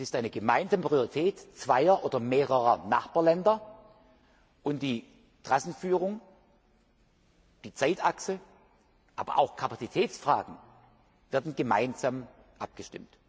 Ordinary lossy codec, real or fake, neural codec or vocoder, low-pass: none; real; none; none